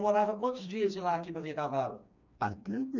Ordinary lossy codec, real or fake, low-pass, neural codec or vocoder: none; fake; 7.2 kHz; codec, 16 kHz, 2 kbps, FreqCodec, smaller model